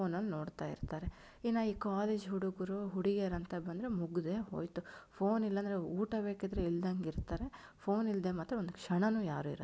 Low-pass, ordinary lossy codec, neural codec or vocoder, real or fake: none; none; none; real